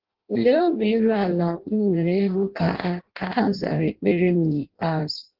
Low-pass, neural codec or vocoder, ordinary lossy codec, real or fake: 5.4 kHz; codec, 16 kHz in and 24 kHz out, 0.6 kbps, FireRedTTS-2 codec; Opus, 16 kbps; fake